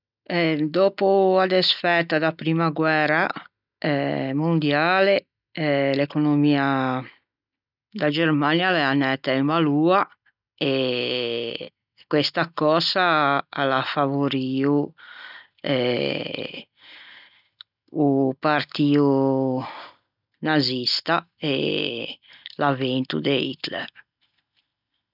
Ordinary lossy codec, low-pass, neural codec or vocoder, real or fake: none; 5.4 kHz; none; real